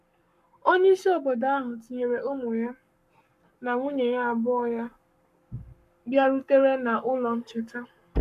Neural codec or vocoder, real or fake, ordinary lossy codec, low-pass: codec, 44.1 kHz, 7.8 kbps, Pupu-Codec; fake; MP3, 96 kbps; 14.4 kHz